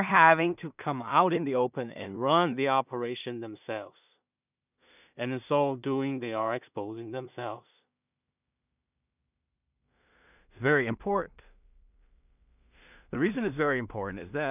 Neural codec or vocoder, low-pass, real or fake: codec, 16 kHz in and 24 kHz out, 0.4 kbps, LongCat-Audio-Codec, two codebook decoder; 3.6 kHz; fake